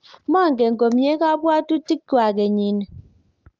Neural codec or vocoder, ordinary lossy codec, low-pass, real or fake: none; Opus, 32 kbps; 7.2 kHz; real